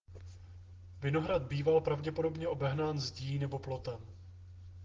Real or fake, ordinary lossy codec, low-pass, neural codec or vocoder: real; Opus, 16 kbps; 7.2 kHz; none